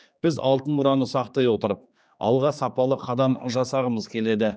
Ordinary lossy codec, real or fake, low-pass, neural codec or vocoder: none; fake; none; codec, 16 kHz, 2 kbps, X-Codec, HuBERT features, trained on general audio